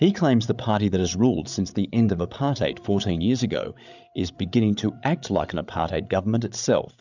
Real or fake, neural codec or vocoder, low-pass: fake; codec, 16 kHz, 8 kbps, FreqCodec, larger model; 7.2 kHz